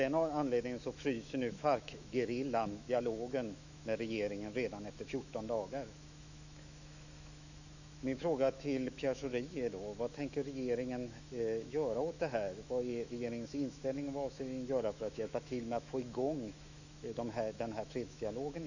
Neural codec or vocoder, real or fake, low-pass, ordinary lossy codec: autoencoder, 48 kHz, 128 numbers a frame, DAC-VAE, trained on Japanese speech; fake; 7.2 kHz; none